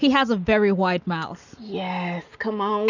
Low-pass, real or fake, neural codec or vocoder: 7.2 kHz; real; none